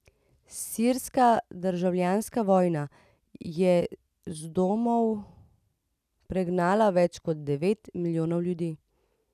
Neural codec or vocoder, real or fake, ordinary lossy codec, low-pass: none; real; none; 14.4 kHz